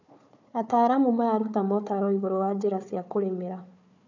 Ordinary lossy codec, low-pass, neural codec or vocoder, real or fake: none; 7.2 kHz; codec, 16 kHz, 4 kbps, FunCodec, trained on Chinese and English, 50 frames a second; fake